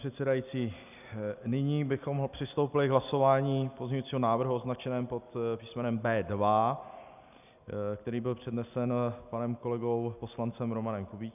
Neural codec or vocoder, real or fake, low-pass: none; real; 3.6 kHz